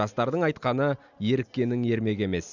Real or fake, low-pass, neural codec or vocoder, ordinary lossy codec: real; 7.2 kHz; none; none